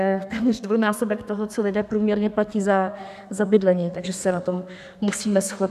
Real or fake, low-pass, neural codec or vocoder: fake; 14.4 kHz; codec, 32 kHz, 1.9 kbps, SNAC